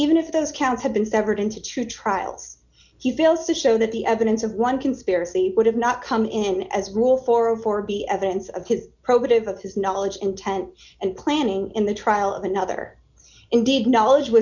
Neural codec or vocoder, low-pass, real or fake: none; 7.2 kHz; real